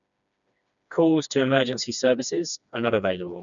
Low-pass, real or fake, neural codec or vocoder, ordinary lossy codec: 7.2 kHz; fake; codec, 16 kHz, 2 kbps, FreqCodec, smaller model; none